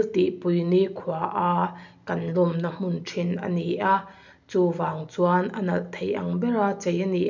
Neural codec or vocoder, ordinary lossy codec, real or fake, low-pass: none; none; real; 7.2 kHz